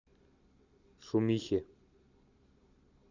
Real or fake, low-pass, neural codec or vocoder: real; 7.2 kHz; none